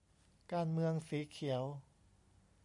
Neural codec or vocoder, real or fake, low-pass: none; real; 10.8 kHz